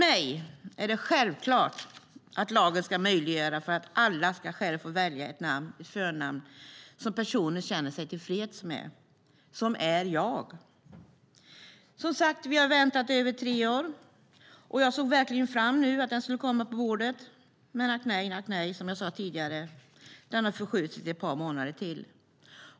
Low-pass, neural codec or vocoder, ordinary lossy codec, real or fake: none; none; none; real